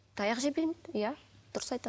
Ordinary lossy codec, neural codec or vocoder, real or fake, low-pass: none; none; real; none